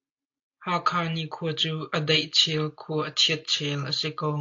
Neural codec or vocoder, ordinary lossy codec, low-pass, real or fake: none; AAC, 48 kbps; 7.2 kHz; real